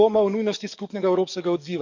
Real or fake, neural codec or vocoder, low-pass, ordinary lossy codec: fake; codec, 44.1 kHz, 7.8 kbps, DAC; 7.2 kHz; none